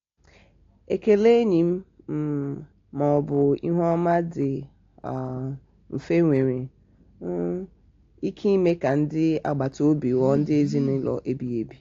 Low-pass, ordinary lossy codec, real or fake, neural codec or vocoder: 7.2 kHz; AAC, 48 kbps; real; none